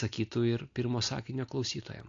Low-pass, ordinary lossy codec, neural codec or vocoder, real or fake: 7.2 kHz; AAC, 48 kbps; none; real